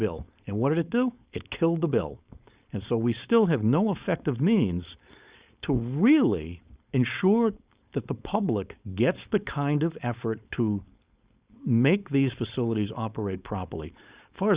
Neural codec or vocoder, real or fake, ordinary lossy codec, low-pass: codec, 16 kHz, 4.8 kbps, FACodec; fake; Opus, 64 kbps; 3.6 kHz